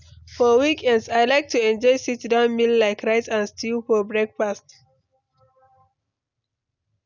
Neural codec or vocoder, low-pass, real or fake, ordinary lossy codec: none; 7.2 kHz; real; none